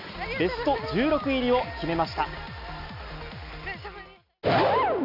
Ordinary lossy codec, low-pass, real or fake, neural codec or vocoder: none; 5.4 kHz; real; none